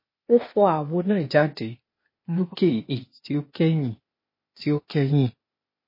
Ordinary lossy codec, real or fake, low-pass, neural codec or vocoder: MP3, 24 kbps; fake; 5.4 kHz; codec, 16 kHz, 0.8 kbps, ZipCodec